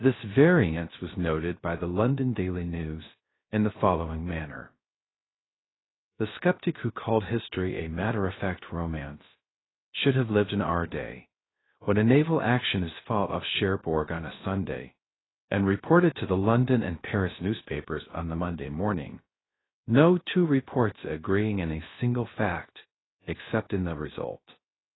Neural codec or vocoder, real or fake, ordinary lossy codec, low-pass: codec, 16 kHz, 0.3 kbps, FocalCodec; fake; AAC, 16 kbps; 7.2 kHz